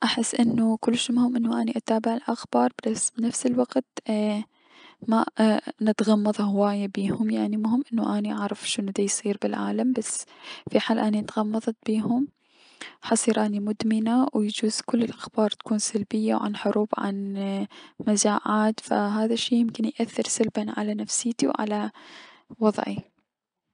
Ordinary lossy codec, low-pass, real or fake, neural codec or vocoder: none; 9.9 kHz; real; none